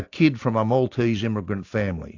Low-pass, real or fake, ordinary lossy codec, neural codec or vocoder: 7.2 kHz; real; AAC, 48 kbps; none